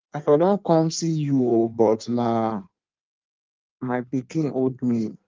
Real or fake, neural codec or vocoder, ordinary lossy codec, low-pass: fake; codec, 16 kHz in and 24 kHz out, 1.1 kbps, FireRedTTS-2 codec; Opus, 24 kbps; 7.2 kHz